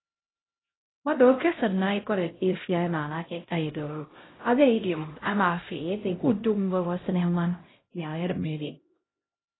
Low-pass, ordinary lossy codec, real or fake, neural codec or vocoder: 7.2 kHz; AAC, 16 kbps; fake; codec, 16 kHz, 0.5 kbps, X-Codec, HuBERT features, trained on LibriSpeech